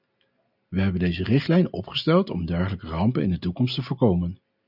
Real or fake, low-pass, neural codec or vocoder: real; 5.4 kHz; none